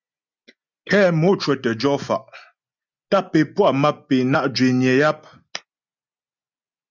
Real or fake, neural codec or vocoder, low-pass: real; none; 7.2 kHz